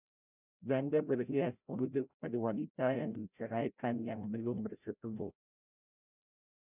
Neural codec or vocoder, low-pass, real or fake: codec, 16 kHz, 0.5 kbps, FreqCodec, larger model; 3.6 kHz; fake